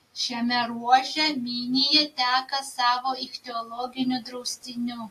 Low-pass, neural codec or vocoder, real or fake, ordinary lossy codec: 14.4 kHz; none; real; AAC, 64 kbps